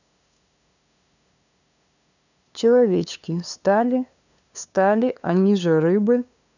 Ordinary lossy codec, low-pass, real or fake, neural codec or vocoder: none; 7.2 kHz; fake; codec, 16 kHz, 2 kbps, FunCodec, trained on LibriTTS, 25 frames a second